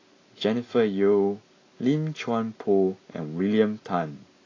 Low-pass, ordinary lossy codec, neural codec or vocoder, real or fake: 7.2 kHz; AAC, 32 kbps; none; real